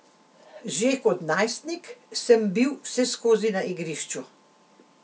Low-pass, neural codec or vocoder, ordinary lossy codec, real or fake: none; none; none; real